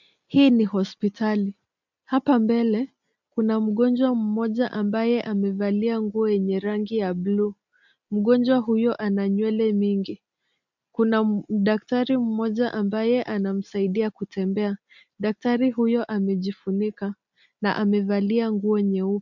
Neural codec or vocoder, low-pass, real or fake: none; 7.2 kHz; real